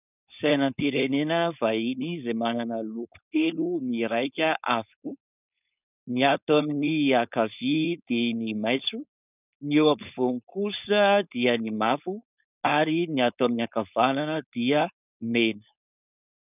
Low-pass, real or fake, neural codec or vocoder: 3.6 kHz; fake; codec, 16 kHz, 4.8 kbps, FACodec